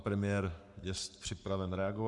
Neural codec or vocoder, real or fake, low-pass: codec, 44.1 kHz, 7.8 kbps, Pupu-Codec; fake; 10.8 kHz